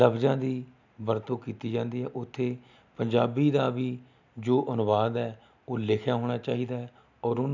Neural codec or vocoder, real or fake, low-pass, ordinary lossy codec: none; real; 7.2 kHz; none